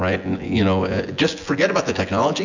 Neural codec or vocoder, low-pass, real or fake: vocoder, 24 kHz, 100 mel bands, Vocos; 7.2 kHz; fake